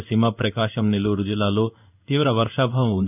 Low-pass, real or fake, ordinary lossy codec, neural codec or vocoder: 3.6 kHz; fake; none; codec, 16 kHz in and 24 kHz out, 1 kbps, XY-Tokenizer